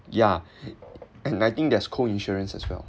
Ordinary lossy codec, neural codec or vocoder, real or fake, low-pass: none; none; real; none